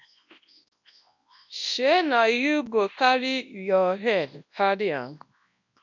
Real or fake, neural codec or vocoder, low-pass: fake; codec, 24 kHz, 0.9 kbps, WavTokenizer, large speech release; 7.2 kHz